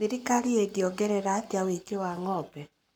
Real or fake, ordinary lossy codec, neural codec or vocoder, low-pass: fake; none; codec, 44.1 kHz, 7.8 kbps, Pupu-Codec; none